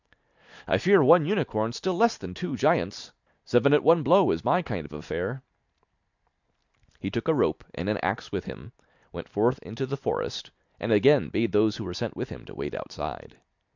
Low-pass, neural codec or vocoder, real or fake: 7.2 kHz; none; real